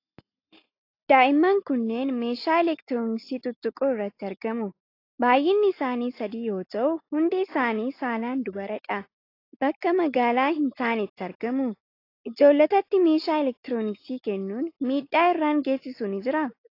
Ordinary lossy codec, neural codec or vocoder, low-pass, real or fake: AAC, 32 kbps; none; 5.4 kHz; real